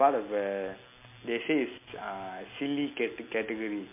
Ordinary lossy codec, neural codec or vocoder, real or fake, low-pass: MP3, 24 kbps; none; real; 3.6 kHz